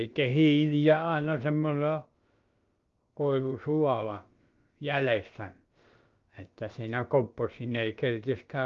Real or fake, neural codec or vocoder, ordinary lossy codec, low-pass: fake; codec, 16 kHz, about 1 kbps, DyCAST, with the encoder's durations; Opus, 32 kbps; 7.2 kHz